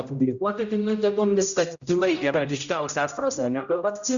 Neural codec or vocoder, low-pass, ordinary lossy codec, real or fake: codec, 16 kHz, 0.5 kbps, X-Codec, HuBERT features, trained on general audio; 7.2 kHz; Opus, 64 kbps; fake